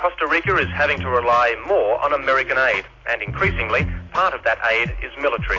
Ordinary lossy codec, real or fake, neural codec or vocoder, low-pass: AAC, 32 kbps; real; none; 7.2 kHz